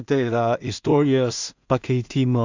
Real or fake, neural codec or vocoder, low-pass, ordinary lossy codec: fake; codec, 16 kHz in and 24 kHz out, 0.4 kbps, LongCat-Audio-Codec, two codebook decoder; 7.2 kHz; Opus, 64 kbps